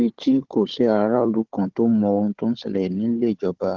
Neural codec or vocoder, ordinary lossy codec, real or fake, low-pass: codec, 16 kHz, 4 kbps, FunCodec, trained on Chinese and English, 50 frames a second; Opus, 16 kbps; fake; 7.2 kHz